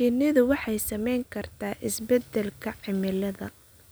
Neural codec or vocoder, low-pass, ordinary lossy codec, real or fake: none; none; none; real